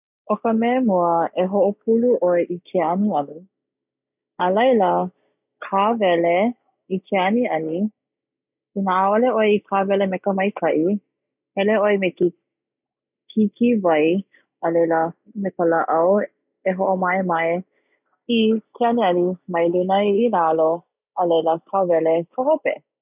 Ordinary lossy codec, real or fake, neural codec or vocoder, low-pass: none; real; none; 3.6 kHz